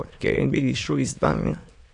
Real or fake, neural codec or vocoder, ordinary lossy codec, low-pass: fake; autoencoder, 22.05 kHz, a latent of 192 numbers a frame, VITS, trained on many speakers; AAC, 64 kbps; 9.9 kHz